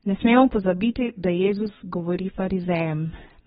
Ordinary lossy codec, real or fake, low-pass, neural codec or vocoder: AAC, 16 kbps; fake; 14.4 kHz; codec, 32 kHz, 1.9 kbps, SNAC